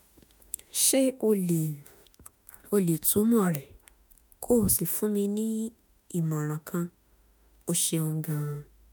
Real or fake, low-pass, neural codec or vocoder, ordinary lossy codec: fake; none; autoencoder, 48 kHz, 32 numbers a frame, DAC-VAE, trained on Japanese speech; none